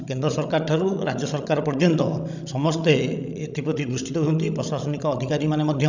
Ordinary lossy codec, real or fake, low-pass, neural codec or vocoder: none; fake; 7.2 kHz; codec, 16 kHz, 16 kbps, FreqCodec, larger model